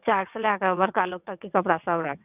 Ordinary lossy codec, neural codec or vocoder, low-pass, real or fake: none; vocoder, 22.05 kHz, 80 mel bands, WaveNeXt; 3.6 kHz; fake